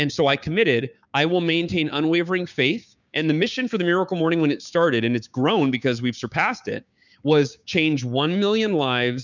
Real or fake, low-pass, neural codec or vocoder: fake; 7.2 kHz; codec, 16 kHz, 6 kbps, DAC